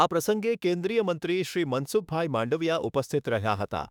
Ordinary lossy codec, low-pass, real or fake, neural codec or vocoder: none; 19.8 kHz; fake; autoencoder, 48 kHz, 32 numbers a frame, DAC-VAE, trained on Japanese speech